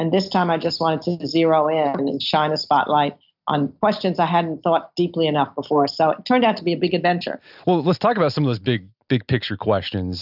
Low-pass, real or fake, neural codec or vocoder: 5.4 kHz; real; none